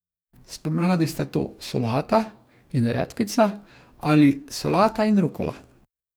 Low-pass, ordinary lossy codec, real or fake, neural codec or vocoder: none; none; fake; codec, 44.1 kHz, 2.6 kbps, DAC